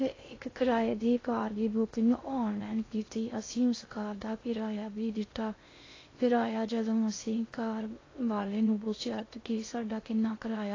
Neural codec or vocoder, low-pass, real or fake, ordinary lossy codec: codec, 16 kHz in and 24 kHz out, 0.6 kbps, FocalCodec, streaming, 2048 codes; 7.2 kHz; fake; AAC, 32 kbps